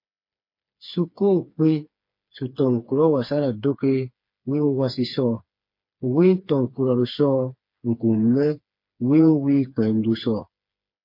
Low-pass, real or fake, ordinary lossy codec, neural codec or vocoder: 5.4 kHz; fake; MP3, 32 kbps; codec, 16 kHz, 4 kbps, FreqCodec, smaller model